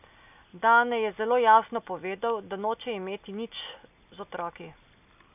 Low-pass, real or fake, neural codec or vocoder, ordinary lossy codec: 3.6 kHz; real; none; none